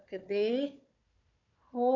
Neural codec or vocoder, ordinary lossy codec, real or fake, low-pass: codec, 16 kHz, 4 kbps, FunCodec, trained on Chinese and English, 50 frames a second; none; fake; 7.2 kHz